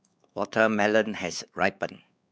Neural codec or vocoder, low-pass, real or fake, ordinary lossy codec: codec, 16 kHz, 4 kbps, X-Codec, WavLM features, trained on Multilingual LibriSpeech; none; fake; none